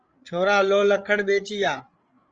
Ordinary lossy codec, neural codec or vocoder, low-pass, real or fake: Opus, 24 kbps; codec, 16 kHz, 8 kbps, FreqCodec, larger model; 7.2 kHz; fake